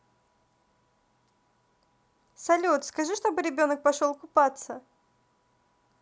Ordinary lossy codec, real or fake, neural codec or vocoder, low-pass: none; real; none; none